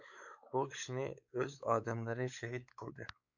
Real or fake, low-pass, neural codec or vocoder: fake; 7.2 kHz; codec, 16 kHz, 4 kbps, X-Codec, WavLM features, trained on Multilingual LibriSpeech